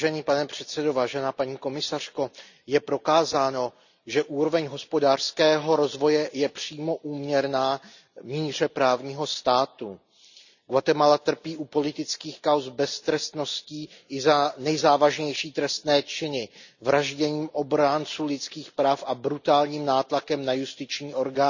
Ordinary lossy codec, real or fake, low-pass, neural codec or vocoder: none; real; 7.2 kHz; none